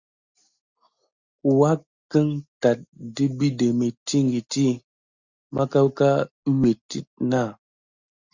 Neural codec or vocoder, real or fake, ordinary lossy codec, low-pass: none; real; Opus, 64 kbps; 7.2 kHz